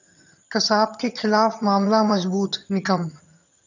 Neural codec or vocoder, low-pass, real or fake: vocoder, 22.05 kHz, 80 mel bands, HiFi-GAN; 7.2 kHz; fake